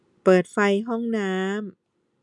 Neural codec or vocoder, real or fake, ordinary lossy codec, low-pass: none; real; none; 10.8 kHz